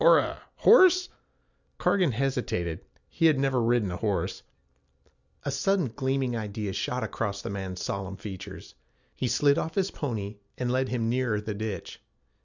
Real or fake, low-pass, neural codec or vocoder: real; 7.2 kHz; none